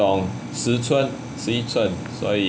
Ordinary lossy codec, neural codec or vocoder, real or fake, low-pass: none; none; real; none